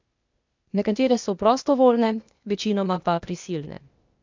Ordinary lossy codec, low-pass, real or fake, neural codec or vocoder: MP3, 64 kbps; 7.2 kHz; fake; codec, 16 kHz, 0.8 kbps, ZipCodec